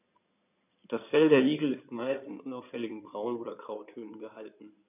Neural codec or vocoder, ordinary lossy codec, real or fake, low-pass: codec, 16 kHz in and 24 kHz out, 2.2 kbps, FireRedTTS-2 codec; none; fake; 3.6 kHz